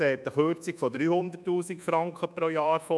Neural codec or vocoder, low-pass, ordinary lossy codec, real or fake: codec, 24 kHz, 1.2 kbps, DualCodec; none; none; fake